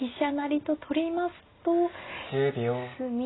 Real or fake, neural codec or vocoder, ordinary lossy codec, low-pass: real; none; AAC, 16 kbps; 7.2 kHz